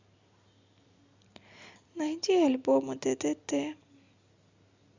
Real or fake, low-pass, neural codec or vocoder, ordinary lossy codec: real; 7.2 kHz; none; Opus, 64 kbps